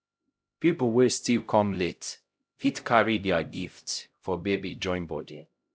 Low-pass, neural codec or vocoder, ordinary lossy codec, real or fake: none; codec, 16 kHz, 0.5 kbps, X-Codec, HuBERT features, trained on LibriSpeech; none; fake